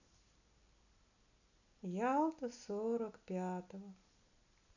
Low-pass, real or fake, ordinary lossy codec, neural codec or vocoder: 7.2 kHz; real; none; none